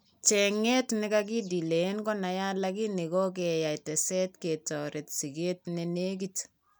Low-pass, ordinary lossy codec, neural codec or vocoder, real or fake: none; none; none; real